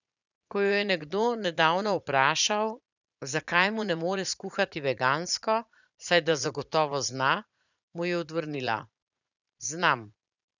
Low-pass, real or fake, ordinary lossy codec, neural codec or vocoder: 7.2 kHz; fake; none; vocoder, 44.1 kHz, 80 mel bands, Vocos